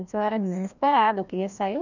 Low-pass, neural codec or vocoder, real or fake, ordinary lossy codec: 7.2 kHz; codec, 16 kHz, 1 kbps, FreqCodec, larger model; fake; none